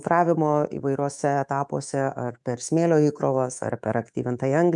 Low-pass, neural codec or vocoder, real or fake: 10.8 kHz; codec, 24 kHz, 3.1 kbps, DualCodec; fake